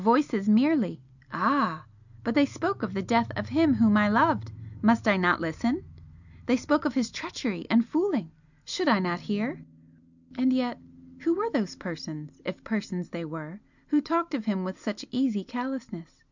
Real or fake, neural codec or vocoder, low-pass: real; none; 7.2 kHz